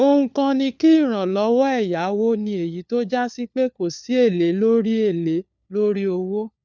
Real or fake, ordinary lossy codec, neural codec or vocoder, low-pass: fake; none; codec, 16 kHz, 4 kbps, FunCodec, trained on LibriTTS, 50 frames a second; none